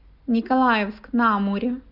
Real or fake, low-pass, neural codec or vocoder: real; 5.4 kHz; none